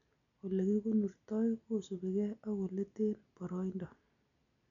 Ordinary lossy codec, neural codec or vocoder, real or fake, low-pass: none; none; real; 7.2 kHz